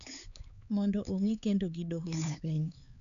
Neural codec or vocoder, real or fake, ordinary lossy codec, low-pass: codec, 16 kHz, 4 kbps, X-Codec, HuBERT features, trained on LibriSpeech; fake; none; 7.2 kHz